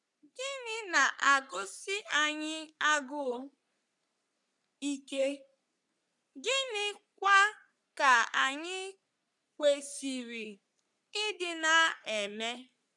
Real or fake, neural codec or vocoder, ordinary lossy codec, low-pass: fake; codec, 44.1 kHz, 3.4 kbps, Pupu-Codec; none; 10.8 kHz